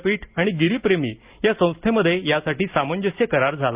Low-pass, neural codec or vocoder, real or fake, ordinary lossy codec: 3.6 kHz; none; real; Opus, 32 kbps